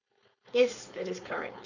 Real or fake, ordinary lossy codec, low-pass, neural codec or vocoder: fake; none; 7.2 kHz; codec, 16 kHz, 4.8 kbps, FACodec